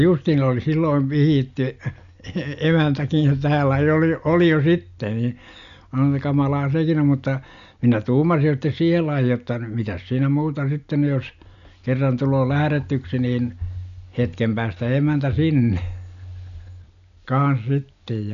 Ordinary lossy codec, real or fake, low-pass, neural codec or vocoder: none; real; 7.2 kHz; none